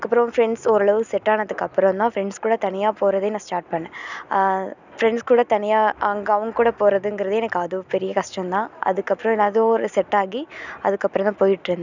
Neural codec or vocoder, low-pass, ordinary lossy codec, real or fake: none; 7.2 kHz; none; real